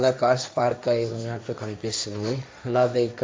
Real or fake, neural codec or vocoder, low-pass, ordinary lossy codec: fake; codec, 16 kHz, 1.1 kbps, Voila-Tokenizer; none; none